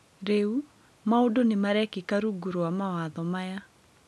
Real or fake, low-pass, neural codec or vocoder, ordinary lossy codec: real; none; none; none